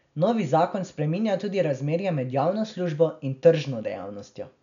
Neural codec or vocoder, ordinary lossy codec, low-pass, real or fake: none; none; 7.2 kHz; real